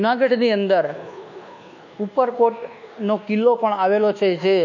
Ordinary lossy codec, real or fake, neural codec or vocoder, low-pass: none; fake; codec, 24 kHz, 1.2 kbps, DualCodec; 7.2 kHz